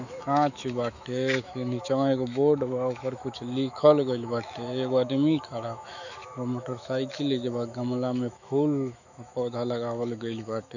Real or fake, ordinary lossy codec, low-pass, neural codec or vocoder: fake; none; 7.2 kHz; autoencoder, 48 kHz, 128 numbers a frame, DAC-VAE, trained on Japanese speech